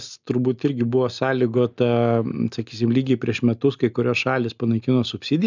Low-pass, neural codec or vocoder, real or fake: 7.2 kHz; none; real